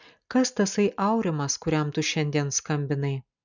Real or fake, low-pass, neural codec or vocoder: real; 7.2 kHz; none